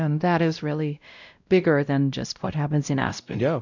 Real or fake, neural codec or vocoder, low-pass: fake; codec, 16 kHz, 0.5 kbps, X-Codec, WavLM features, trained on Multilingual LibriSpeech; 7.2 kHz